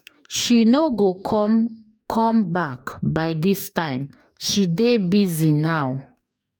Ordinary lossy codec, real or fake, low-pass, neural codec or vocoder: none; fake; 19.8 kHz; codec, 44.1 kHz, 2.6 kbps, DAC